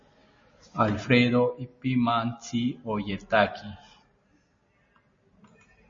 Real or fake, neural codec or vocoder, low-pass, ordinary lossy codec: real; none; 7.2 kHz; MP3, 32 kbps